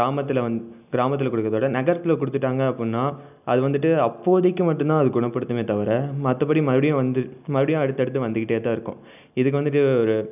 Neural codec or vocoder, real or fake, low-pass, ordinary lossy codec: none; real; 3.6 kHz; none